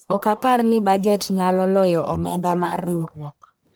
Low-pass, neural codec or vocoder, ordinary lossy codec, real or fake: none; codec, 44.1 kHz, 1.7 kbps, Pupu-Codec; none; fake